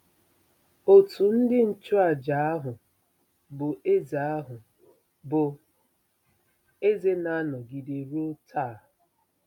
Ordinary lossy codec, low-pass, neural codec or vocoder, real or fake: MP3, 96 kbps; 19.8 kHz; none; real